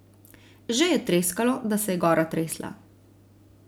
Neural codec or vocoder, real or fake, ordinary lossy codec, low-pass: none; real; none; none